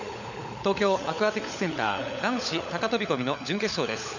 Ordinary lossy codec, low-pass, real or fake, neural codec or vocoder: none; 7.2 kHz; fake; codec, 16 kHz, 16 kbps, FunCodec, trained on LibriTTS, 50 frames a second